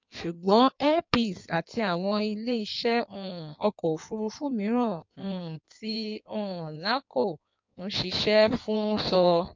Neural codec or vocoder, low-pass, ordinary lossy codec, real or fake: codec, 16 kHz in and 24 kHz out, 1.1 kbps, FireRedTTS-2 codec; 7.2 kHz; MP3, 64 kbps; fake